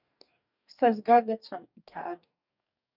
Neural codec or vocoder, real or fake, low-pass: codec, 44.1 kHz, 2.6 kbps, DAC; fake; 5.4 kHz